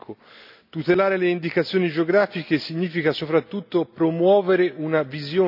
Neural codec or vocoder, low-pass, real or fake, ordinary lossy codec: none; 5.4 kHz; real; none